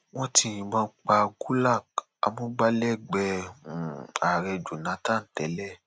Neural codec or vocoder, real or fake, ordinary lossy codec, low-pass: none; real; none; none